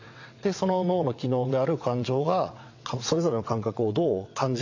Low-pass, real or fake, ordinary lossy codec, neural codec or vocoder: 7.2 kHz; fake; AAC, 48 kbps; vocoder, 22.05 kHz, 80 mel bands, WaveNeXt